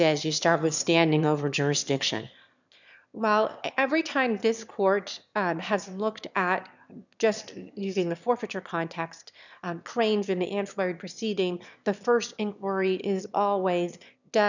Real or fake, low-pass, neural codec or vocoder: fake; 7.2 kHz; autoencoder, 22.05 kHz, a latent of 192 numbers a frame, VITS, trained on one speaker